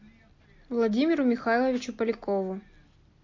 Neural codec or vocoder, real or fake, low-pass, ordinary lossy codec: none; real; 7.2 kHz; MP3, 48 kbps